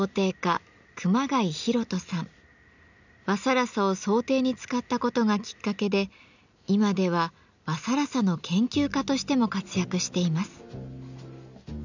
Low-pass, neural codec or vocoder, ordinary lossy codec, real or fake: 7.2 kHz; none; none; real